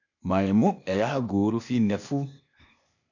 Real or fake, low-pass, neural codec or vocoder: fake; 7.2 kHz; codec, 16 kHz, 0.8 kbps, ZipCodec